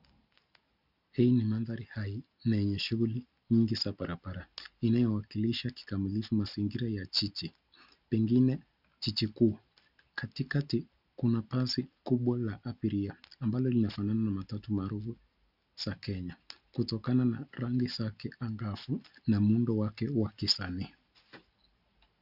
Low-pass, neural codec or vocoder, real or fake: 5.4 kHz; none; real